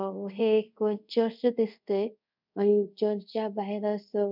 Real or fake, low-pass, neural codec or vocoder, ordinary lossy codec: fake; 5.4 kHz; codec, 24 kHz, 0.5 kbps, DualCodec; none